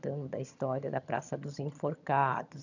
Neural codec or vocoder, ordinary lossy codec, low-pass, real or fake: vocoder, 22.05 kHz, 80 mel bands, HiFi-GAN; none; 7.2 kHz; fake